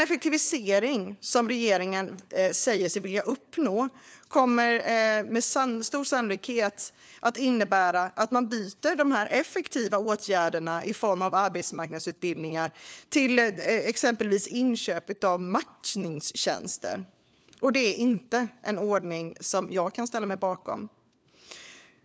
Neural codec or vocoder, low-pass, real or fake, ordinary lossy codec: codec, 16 kHz, 4 kbps, FunCodec, trained on LibriTTS, 50 frames a second; none; fake; none